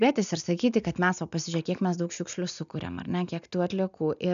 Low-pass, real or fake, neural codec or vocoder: 7.2 kHz; real; none